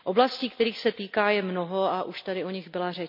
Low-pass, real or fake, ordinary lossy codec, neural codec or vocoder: 5.4 kHz; real; MP3, 48 kbps; none